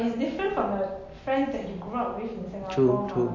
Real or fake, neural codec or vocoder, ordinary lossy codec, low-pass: real; none; MP3, 32 kbps; 7.2 kHz